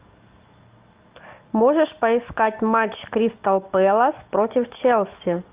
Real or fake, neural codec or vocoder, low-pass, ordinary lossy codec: real; none; 3.6 kHz; Opus, 32 kbps